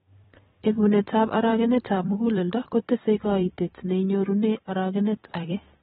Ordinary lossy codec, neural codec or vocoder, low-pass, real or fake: AAC, 16 kbps; vocoder, 44.1 kHz, 128 mel bands every 256 samples, BigVGAN v2; 19.8 kHz; fake